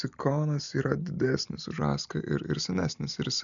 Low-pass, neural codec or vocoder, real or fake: 7.2 kHz; none; real